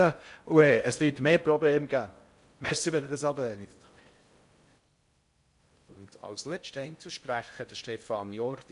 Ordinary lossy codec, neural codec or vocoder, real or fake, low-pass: MP3, 64 kbps; codec, 16 kHz in and 24 kHz out, 0.6 kbps, FocalCodec, streaming, 4096 codes; fake; 10.8 kHz